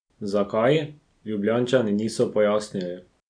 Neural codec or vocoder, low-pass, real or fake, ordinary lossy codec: none; 9.9 kHz; real; none